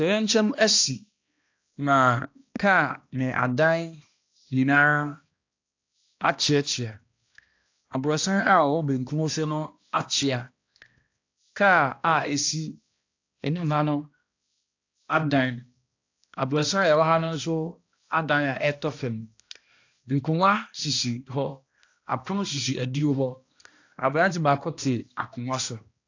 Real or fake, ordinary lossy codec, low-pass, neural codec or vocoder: fake; AAC, 48 kbps; 7.2 kHz; codec, 16 kHz, 1 kbps, X-Codec, HuBERT features, trained on balanced general audio